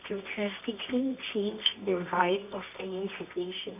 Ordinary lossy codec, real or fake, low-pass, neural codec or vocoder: none; fake; 3.6 kHz; codec, 24 kHz, 0.9 kbps, WavTokenizer, medium music audio release